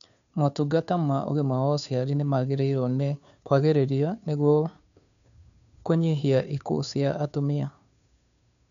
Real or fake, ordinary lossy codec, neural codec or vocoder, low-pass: fake; MP3, 96 kbps; codec, 16 kHz, 2 kbps, FunCodec, trained on Chinese and English, 25 frames a second; 7.2 kHz